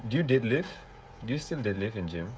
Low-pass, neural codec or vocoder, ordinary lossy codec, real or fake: none; codec, 16 kHz, 16 kbps, FreqCodec, smaller model; none; fake